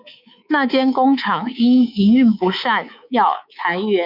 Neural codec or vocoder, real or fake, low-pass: codec, 24 kHz, 3.1 kbps, DualCodec; fake; 5.4 kHz